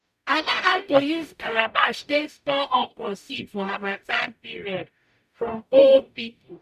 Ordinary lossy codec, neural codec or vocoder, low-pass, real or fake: none; codec, 44.1 kHz, 0.9 kbps, DAC; 14.4 kHz; fake